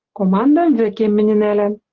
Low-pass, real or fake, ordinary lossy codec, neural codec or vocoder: 7.2 kHz; real; Opus, 16 kbps; none